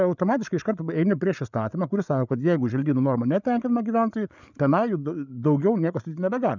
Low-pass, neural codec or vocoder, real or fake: 7.2 kHz; codec, 16 kHz, 8 kbps, FreqCodec, larger model; fake